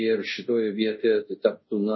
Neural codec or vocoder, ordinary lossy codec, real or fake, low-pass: codec, 24 kHz, 0.5 kbps, DualCodec; MP3, 24 kbps; fake; 7.2 kHz